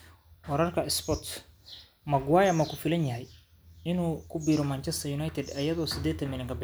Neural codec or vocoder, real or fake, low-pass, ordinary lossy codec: none; real; none; none